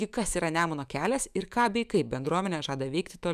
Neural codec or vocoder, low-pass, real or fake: autoencoder, 48 kHz, 128 numbers a frame, DAC-VAE, trained on Japanese speech; 14.4 kHz; fake